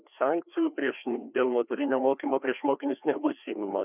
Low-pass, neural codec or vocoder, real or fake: 3.6 kHz; codec, 16 kHz, 2 kbps, FreqCodec, larger model; fake